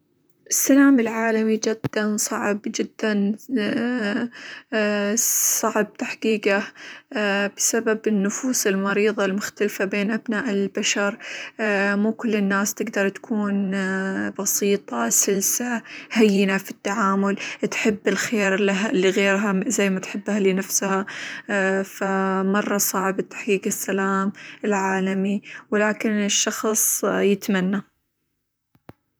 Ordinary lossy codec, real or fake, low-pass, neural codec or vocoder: none; fake; none; vocoder, 44.1 kHz, 128 mel bands, Pupu-Vocoder